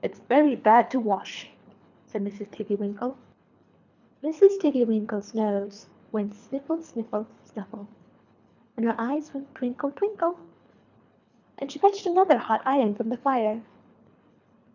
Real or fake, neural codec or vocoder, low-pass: fake; codec, 24 kHz, 3 kbps, HILCodec; 7.2 kHz